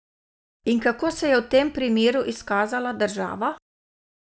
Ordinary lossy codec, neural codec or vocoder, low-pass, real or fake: none; none; none; real